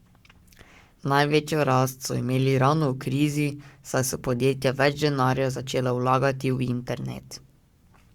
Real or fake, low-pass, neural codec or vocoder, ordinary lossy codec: fake; 19.8 kHz; codec, 44.1 kHz, 7.8 kbps, Pupu-Codec; Opus, 64 kbps